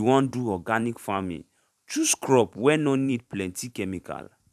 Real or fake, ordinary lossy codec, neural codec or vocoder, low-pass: fake; none; vocoder, 44.1 kHz, 128 mel bands every 512 samples, BigVGAN v2; 14.4 kHz